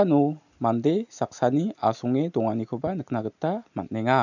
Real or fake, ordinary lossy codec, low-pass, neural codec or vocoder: real; none; 7.2 kHz; none